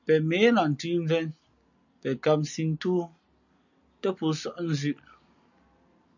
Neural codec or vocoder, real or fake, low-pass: none; real; 7.2 kHz